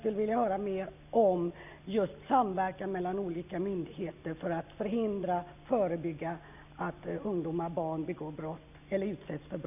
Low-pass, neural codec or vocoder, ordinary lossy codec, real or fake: 3.6 kHz; none; none; real